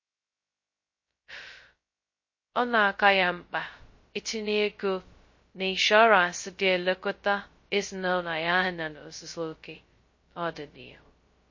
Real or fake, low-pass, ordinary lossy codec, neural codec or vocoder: fake; 7.2 kHz; MP3, 32 kbps; codec, 16 kHz, 0.2 kbps, FocalCodec